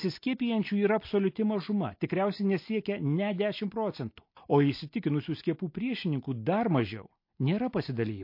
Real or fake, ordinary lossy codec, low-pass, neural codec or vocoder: real; MP3, 32 kbps; 5.4 kHz; none